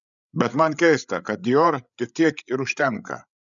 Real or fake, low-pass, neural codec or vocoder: fake; 7.2 kHz; codec, 16 kHz, 8 kbps, FreqCodec, larger model